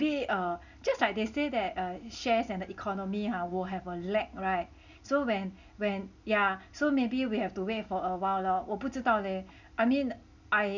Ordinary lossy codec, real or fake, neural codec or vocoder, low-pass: none; real; none; 7.2 kHz